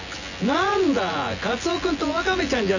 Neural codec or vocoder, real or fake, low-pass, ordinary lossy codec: vocoder, 24 kHz, 100 mel bands, Vocos; fake; 7.2 kHz; none